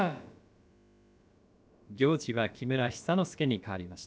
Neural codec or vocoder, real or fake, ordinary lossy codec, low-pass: codec, 16 kHz, about 1 kbps, DyCAST, with the encoder's durations; fake; none; none